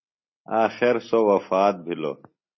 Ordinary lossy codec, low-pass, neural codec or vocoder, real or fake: MP3, 24 kbps; 7.2 kHz; none; real